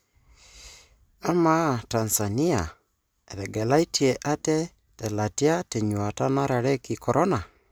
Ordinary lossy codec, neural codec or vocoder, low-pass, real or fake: none; none; none; real